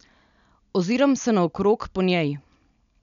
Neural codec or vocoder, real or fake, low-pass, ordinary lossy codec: none; real; 7.2 kHz; none